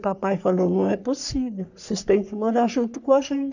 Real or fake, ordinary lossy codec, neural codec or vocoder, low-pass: fake; none; codec, 44.1 kHz, 3.4 kbps, Pupu-Codec; 7.2 kHz